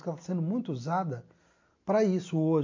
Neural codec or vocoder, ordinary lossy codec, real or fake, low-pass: none; MP3, 48 kbps; real; 7.2 kHz